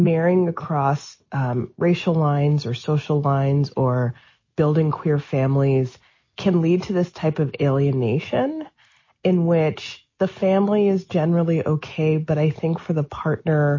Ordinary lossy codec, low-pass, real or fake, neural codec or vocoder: MP3, 32 kbps; 7.2 kHz; real; none